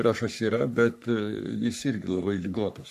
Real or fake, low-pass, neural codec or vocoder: fake; 14.4 kHz; codec, 44.1 kHz, 3.4 kbps, Pupu-Codec